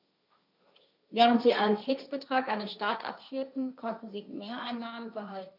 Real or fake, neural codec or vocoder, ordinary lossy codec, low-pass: fake; codec, 16 kHz, 1.1 kbps, Voila-Tokenizer; none; 5.4 kHz